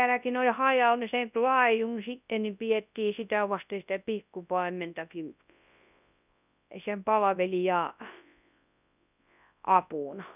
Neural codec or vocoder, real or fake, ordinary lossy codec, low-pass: codec, 24 kHz, 0.9 kbps, WavTokenizer, large speech release; fake; none; 3.6 kHz